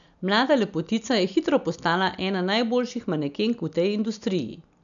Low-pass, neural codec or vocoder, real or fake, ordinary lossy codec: 7.2 kHz; none; real; none